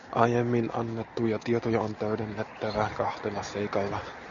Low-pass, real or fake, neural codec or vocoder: 7.2 kHz; real; none